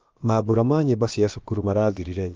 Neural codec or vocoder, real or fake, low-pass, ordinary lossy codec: codec, 16 kHz, about 1 kbps, DyCAST, with the encoder's durations; fake; 7.2 kHz; Opus, 32 kbps